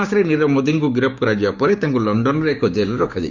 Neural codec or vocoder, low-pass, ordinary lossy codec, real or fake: codec, 16 kHz, 6 kbps, DAC; 7.2 kHz; none; fake